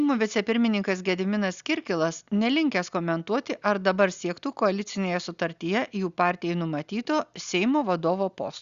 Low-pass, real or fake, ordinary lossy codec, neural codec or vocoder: 7.2 kHz; real; Opus, 64 kbps; none